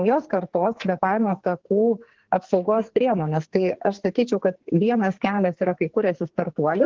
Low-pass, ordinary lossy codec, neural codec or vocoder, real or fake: 7.2 kHz; Opus, 16 kbps; codec, 44.1 kHz, 2.6 kbps, SNAC; fake